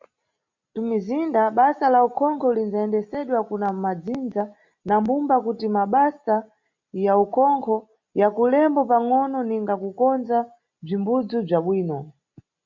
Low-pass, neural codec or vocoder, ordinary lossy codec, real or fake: 7.2 kHz; none; MP3, 64 kbps; real